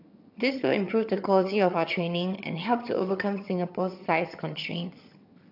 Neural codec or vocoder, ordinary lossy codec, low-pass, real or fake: vocoder, 22.05 kHz, 80 mel bands, HiFi-GAN; AAC, 48 kbps; 5.4 kHz; fake